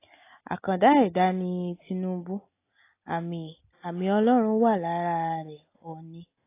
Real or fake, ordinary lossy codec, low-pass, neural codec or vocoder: real; AAC, 24 kbps; 3.6 kHz; none